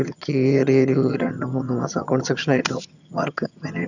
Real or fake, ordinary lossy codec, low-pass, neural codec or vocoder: fake; none; 7.2 kHz; vocoder, 22.05 kHz, 80 mel bands, HiFi-GAN